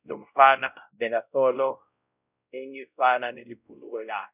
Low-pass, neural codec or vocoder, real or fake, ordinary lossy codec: 3.6 kHz; codec, 16 kHz, 0.5 kbps, X-Codec, WavLM features, trained on Multilingual LibriSpeech; fake; none